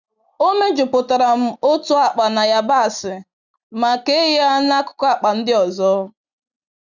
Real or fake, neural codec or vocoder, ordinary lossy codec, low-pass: real; none; none; 7.2 kHz